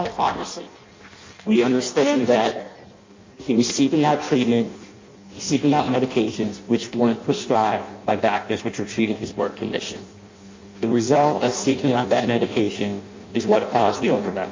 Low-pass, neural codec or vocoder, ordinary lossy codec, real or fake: 7.2 kHz; codec, 16 kHz in and 24 kHz out, 0.6 kbps, FireRedTTS-2 codec; MP3, 48 kbps; fake